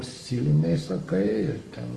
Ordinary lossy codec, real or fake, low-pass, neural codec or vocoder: Opus, 32 kbps; fake; 10.8 kHz; codec, 44.1 kHz, 7.8 kbps, Pupu-Codec